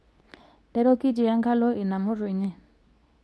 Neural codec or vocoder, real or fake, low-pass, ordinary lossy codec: codec, 24 kHz, 0.9 kbps, WavTokenizer, medium speech release version 2; fake; none; none